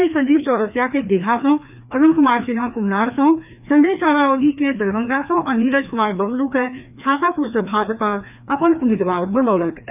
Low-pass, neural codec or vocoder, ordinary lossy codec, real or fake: 3.6 kHz; codec, 16 kHz, 2 kbps, FreqCodec, larger model; none; fake